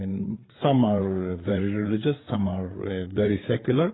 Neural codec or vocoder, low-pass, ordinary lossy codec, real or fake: codec, 16 kHz, 8 kbps, FreqCodec, larger model; 7.2 kHz; AAC, 16 kbps; fake